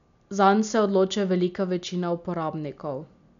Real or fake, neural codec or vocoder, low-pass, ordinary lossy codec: real; none; 7.2 kHz; none